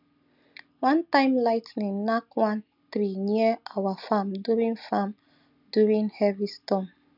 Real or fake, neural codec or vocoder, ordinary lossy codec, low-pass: real; none; none; 5.4 kHz